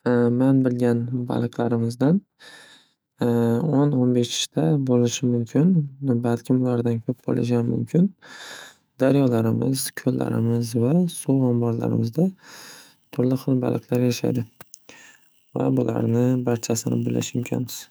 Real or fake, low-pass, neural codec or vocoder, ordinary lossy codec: fake; none; autoencoder, 48 kHz, 128 numbers a frame, DAC-VAE, trained on Japanese speech; none